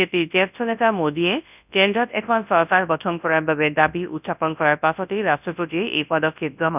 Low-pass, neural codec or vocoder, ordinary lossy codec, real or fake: 3.6 kHz; codec, 24 kHz, 0.9 kbps, WavTokenizer, large speech release; none; fake